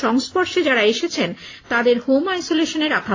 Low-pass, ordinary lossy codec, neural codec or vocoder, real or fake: 7.2 kHz; AAC, 32 kbps; none; real